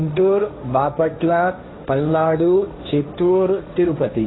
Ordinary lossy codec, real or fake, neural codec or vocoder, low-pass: AAC, 16 kbps; fake; codec, 16 kHz, 1.1 kbps, Voila-Tokenizer; 7.2 kHz